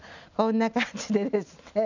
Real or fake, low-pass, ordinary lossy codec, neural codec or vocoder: real; 7.2 kHz; none; none